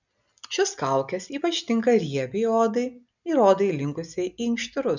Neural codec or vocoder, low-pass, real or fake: none; 7.2 kHz; real